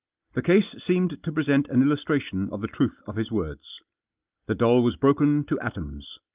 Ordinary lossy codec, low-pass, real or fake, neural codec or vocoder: Opus, 24 kbps; 3.6 kHz; real; none